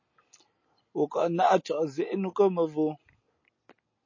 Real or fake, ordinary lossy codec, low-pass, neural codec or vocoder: real; MP3, 32 kbps; 7.2 kHz; none